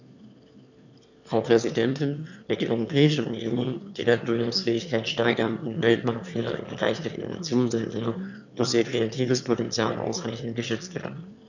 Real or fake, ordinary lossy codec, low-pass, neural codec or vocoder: fake; none; 7.2 kHz; autoencoder, 22.05 kHz, a latent of 192 numbers a frame, VITS, trained on one speaker